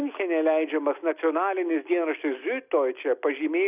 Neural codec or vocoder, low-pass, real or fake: none; 3.6 kHz; real